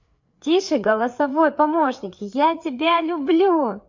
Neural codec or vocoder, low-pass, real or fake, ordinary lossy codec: codec, 16 kHz, 4 kbps, FreqCodec, larger model; 7.2 kHz; fake; MP3, 48 kbps